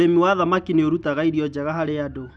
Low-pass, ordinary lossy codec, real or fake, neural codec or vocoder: none; none; real; none